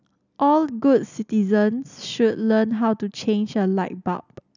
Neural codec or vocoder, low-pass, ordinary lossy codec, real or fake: none; 7.2 kHz; none; real